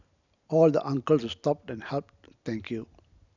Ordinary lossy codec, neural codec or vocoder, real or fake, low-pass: none; none; real; 7.2 kHz